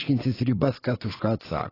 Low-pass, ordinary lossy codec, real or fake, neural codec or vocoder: 5.4 kHz; AAC, 24 kbps; fake; vocoder, 24 kHz, 100 mel bands, Vocos